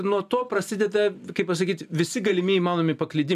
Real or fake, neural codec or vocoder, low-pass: real; none; 14.4 kHz